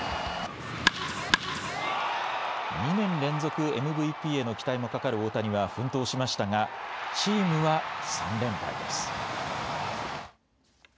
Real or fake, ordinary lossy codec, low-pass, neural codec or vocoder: real; none; none; none